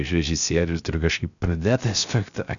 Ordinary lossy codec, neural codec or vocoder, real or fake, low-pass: MP3, 96 kbps; codec, 16 kHz, 0.3 kbps, FocalCodec; fake; 7.2 kHz